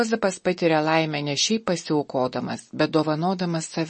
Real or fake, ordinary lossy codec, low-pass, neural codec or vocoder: real; MP3, 32 kbps; 9.9 kHz; none